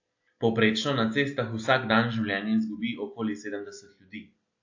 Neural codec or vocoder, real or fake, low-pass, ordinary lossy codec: none; real; 7.2 kHz; AAC, 48 kbps